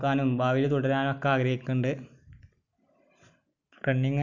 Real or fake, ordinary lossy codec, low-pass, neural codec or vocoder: real; none; 7.2 kHz; none